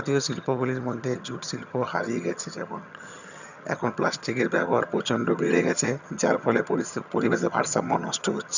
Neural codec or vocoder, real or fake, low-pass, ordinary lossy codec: vocoder, 22.05 kHz, 80 mel bands, HiFi-GAN; fake; 7.2 kHz; none